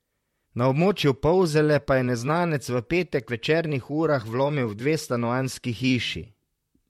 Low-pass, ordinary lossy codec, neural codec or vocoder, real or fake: 19.8 kHz; MP3, 64 kbps; vocoder, 44.1 kHz, 128 mel bands, Pupu-Vocoder; fake